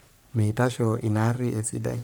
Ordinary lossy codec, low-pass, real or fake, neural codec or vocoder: none; none; fake; codec, 44.1 kHz, 3.4 kbps, Pupu-Codec